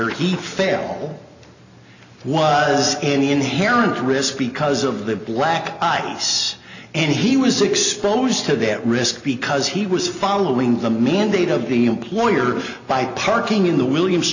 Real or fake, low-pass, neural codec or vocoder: real; 7.2 kHz; none